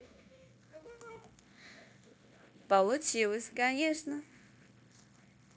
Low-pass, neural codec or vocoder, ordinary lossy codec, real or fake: none; codec, 16 kHz, 0.9 kbps, LongCat-Audio-Codec; none; fake